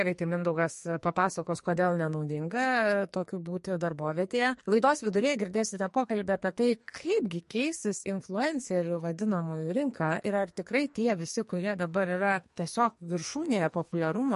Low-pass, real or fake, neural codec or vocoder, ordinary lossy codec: 14.4 kHz; fake; codec, 44.1 kHz, 2.6 kbps, SNAC; MP3, 48 kbps